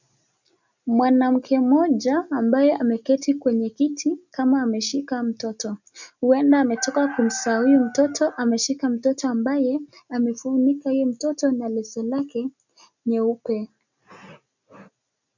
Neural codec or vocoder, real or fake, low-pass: none; real; 7.2 kHz